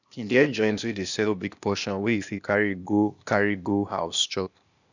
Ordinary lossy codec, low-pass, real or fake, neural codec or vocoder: none; 7.2 kHz; fake; codec, 16 kHz, 0.8 kbps, ZipCodec